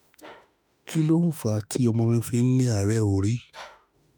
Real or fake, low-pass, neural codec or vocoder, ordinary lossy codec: fake; none; autoencoder, 48 kHz, 32 numbers a frame, DAC-VAE, trained on Japanese speech; none